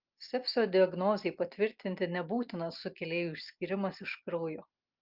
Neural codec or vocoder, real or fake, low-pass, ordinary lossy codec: none; real; 5.4 kHz; Opus, 24 kbps